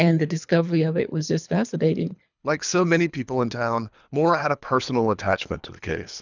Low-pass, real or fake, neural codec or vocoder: 7.2 kHz; fake; codec, 24 kHz, 3 kbps, HILCodec